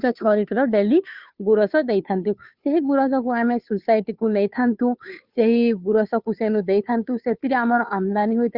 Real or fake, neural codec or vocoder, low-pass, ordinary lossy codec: fake; codec, 16 kHz, 2 kbps, FunCodec, trained on Chinese and English, 25 frames a second; 5.4 kHz; Opus, 64 kbps